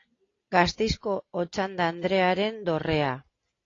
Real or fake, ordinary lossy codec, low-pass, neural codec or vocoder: real; AAC, 32 kbps; 7.2 kHz; none